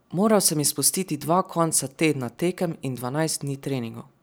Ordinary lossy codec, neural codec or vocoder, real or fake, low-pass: none; none; real; none